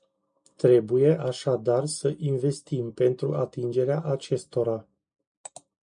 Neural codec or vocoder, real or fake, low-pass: none; real; 9.9 kHz